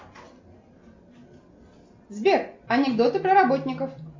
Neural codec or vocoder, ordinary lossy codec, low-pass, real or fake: none; MP3, 48 kbps; 7.2 kHz; real